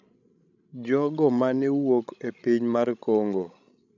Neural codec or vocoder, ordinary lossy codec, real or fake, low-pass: codec, 16 kHz, 16 kbps, FreqCodec, larger model; none; fake; 7.2 kHz